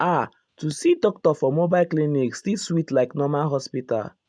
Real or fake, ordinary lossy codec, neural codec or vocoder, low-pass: real; none; none; 9.9 kHz